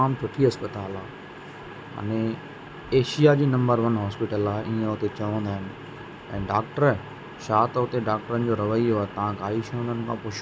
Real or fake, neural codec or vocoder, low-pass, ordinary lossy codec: real; none; none; none